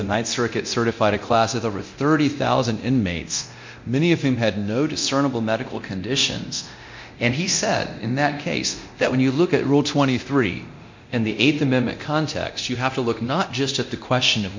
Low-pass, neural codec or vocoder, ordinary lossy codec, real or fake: 7.2 kHz; codec, 24 kHz, 0.9 kbps, DualCodec; MP3, 48 kbps; fake